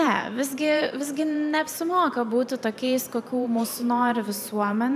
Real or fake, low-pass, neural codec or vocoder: fake; 14.4 kHz; vocoder, 44.1 kHz, 128 mel bands every 512 samples, BigVGAN v2